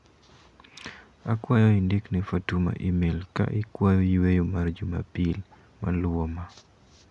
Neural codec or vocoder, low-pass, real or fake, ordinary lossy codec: none; 10.8 kHz; real; none